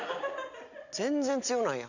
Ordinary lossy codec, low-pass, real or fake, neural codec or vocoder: none; 7.2 kHz; real; none